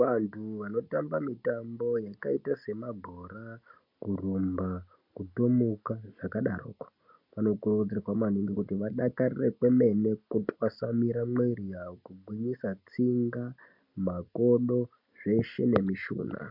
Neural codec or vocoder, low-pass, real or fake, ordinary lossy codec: none; 5.4 kHz; real; MP3, 48 kbps